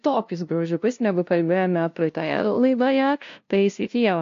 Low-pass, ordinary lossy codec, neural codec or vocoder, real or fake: 7.2 kHz; AAC, 48 kbps; codec, 16 kHz, 0.5 kbps, FunCodec, trained on LibriTTS, 25 frames a second; fake